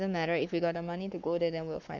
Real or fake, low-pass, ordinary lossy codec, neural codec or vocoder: fake; 7.2 kHz; none; codec, 44.1 kHz, 7.8 kbps, Pupu-Codec